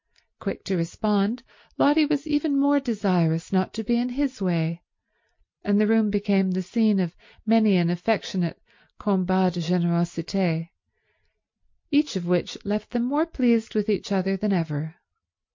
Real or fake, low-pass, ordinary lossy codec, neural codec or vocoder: real; 7.2 kHz; MP3, 48 kbps; none